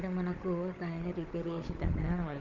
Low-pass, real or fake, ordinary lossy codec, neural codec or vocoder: 7.2 kHz; fake; Opus, 32 kbps; codec, 16 kHz, 16 kbps, FunCodec, trained on Chinese and English, 50 frames a second